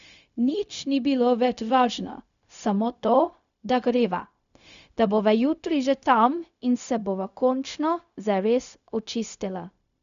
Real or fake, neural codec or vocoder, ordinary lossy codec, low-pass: fake; codec, 16 kHz, 0.4 kbps, LongCat-Audio-Codec; none; 7.2 kHz